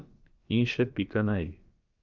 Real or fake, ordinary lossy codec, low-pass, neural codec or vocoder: fake; Opus, 24 kbps; 7.2 kHz; codec, 16 kHz, about 1 kbps, DyCAST, with the encoder's durations